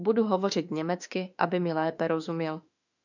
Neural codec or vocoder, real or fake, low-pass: autoencoder, 48 kHz, 32 numbers a frame, DAC-VAE, trained on Japanese speech; fake; 7.2 kHz